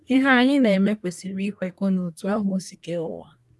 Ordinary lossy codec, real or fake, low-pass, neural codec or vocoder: none; fake; none; codec, 24 kHz, 1 kbps, SNAC